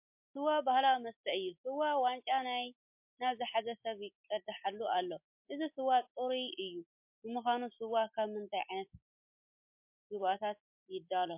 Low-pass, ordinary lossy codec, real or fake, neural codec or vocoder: 3.6 kHz; MP3, 32 kbps; real; none